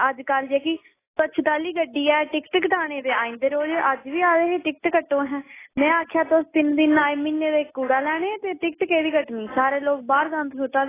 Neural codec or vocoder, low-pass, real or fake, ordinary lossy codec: none; 3.6 kHz; real; AAC, 16 kbps